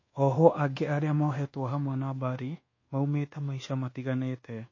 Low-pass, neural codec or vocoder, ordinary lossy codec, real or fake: 7.2 kHz; codec, 24 kHz, 1.2 kbps, DualCodec; MP3, 32 kbps; fake